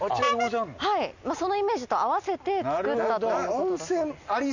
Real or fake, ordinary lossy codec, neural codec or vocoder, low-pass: real; none; none; 7.2 kHz